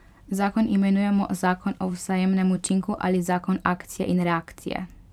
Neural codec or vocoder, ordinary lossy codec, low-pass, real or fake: vocoder, 44.1 kHz, 128 mel bands every 512 samples, BigVGAN v2; none; 19.8 kHz; fake